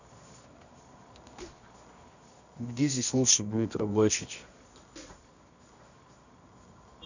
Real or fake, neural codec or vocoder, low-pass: fake; codec, 24 kHz, 0.9 kbps, WavTokenizer, medium music audio release; 7.2 kHz